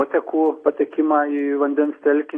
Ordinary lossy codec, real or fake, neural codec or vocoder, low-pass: AAC, 32 kbps; real; none; 9.9 kHz